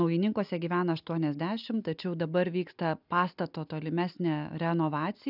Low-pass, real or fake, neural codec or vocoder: 5.4 kHz; real; none